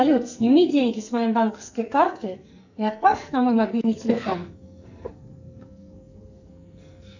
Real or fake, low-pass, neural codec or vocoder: fake; 7.2 kHz; codec, 44.1 kHz, 2.6 kbps, SNAC